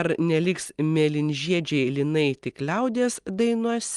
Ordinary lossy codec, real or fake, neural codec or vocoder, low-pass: Opus, 24 kbps; real; none; 10.8 kHz